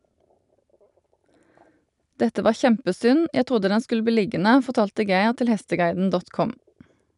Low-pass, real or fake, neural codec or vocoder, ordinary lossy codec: 10.8 kHz; real; none; none